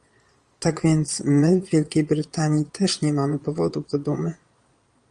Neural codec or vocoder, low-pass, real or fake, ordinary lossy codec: vocoder, 22.05 kHz, 80 mel bands, Vocos; 9.9 kHz; fake; Opus, 32 kbps